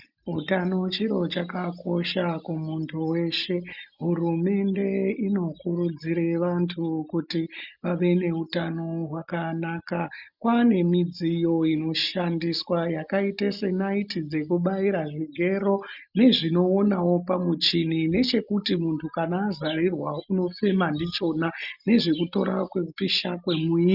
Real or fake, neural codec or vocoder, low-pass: real; none; 5.4 kHz